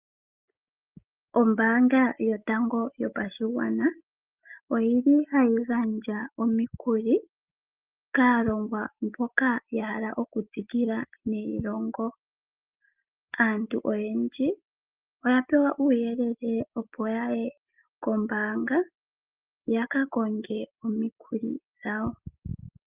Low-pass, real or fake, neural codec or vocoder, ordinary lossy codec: 3.6 kHz; real; none; Opus, 32 kbps